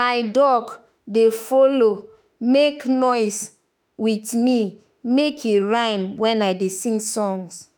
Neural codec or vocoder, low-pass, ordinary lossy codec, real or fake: autoencoder, 48 kHz, 32 numbers a frame, DAC-VAE, trained on Japanese speech; none; none; fake